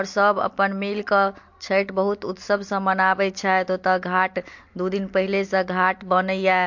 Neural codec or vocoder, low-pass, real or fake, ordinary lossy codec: none; 7.2 kHz; real; MP3, 48 kbps